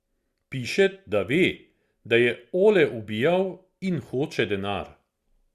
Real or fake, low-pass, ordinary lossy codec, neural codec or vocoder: real; 14.4 kHz; Opus, 64 kbps; none